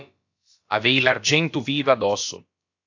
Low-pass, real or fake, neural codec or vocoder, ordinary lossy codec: 7.2 kHz; fake; codec, 16 kHz, about 1 kbps, DyCAST, with the encoder's durations; AAC, 48 kbps